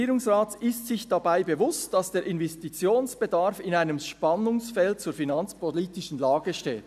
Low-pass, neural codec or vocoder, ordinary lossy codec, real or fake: 14.4 kHz; none; none; real